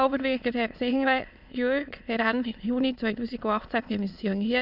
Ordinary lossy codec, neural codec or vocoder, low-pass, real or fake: none; autoencoder, 22.05 kHz, a latent of 192 numbers a frame, VITS, trained on many speakers; 5.4 kHz; fake